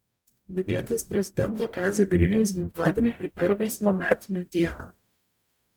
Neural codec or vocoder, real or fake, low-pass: codec, 44.1 kHz, 0.9 kbps, DAC; fake; 19.8 kHz